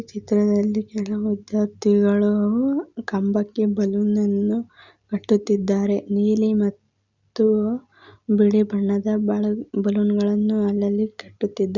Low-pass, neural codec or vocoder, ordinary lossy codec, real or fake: 7.2 kHz; none; Opus, 64 kbps; real